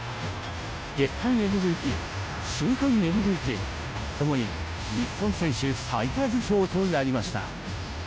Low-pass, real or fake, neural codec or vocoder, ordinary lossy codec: none; fake; codec, 16 kHz, 0.5 kbps, FunCodec, trained on Chinese and English, 25 frames a second; none